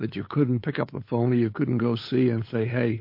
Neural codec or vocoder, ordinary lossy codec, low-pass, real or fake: codec, 16 kHz, 8 kbps, FunCodec, trained on LibriTTS, 25 frames a second; MP3, 32 kbps; 5.4 kHz; fake